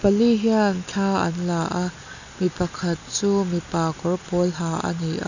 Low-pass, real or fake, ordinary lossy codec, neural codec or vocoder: 7.2 kHz; real; none; none